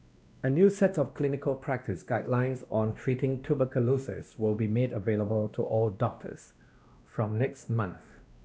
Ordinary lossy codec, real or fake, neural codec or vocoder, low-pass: none; fake; codec, 16 kHz, 1 kbps, X-Codec, WavLM features, trained on Multilingual LibriSpeech; none